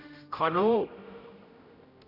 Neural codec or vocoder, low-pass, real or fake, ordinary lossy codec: codec, 16 kHz, 0.5 kbps, X-Codec, HuBERT features, trained on general audio; 5.4 kHz; fake; none